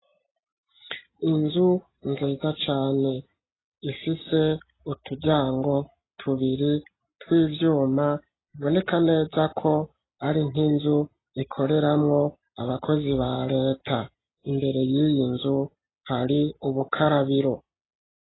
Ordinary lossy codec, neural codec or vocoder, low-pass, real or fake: AAC, 16 kbps; none; 7.2 kHz; real